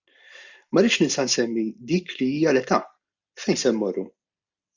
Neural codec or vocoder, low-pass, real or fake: none; 7.2 kHz; real